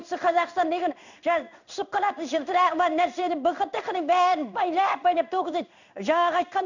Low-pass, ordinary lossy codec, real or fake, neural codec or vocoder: 7.2 kHz; none; fake; codec, 16 kHz in and 24 kHz out, 1 kbps, XY-Tokenizer